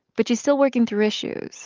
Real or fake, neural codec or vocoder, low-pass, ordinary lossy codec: real; none; 7.2 kHz; Opus, 24 kbps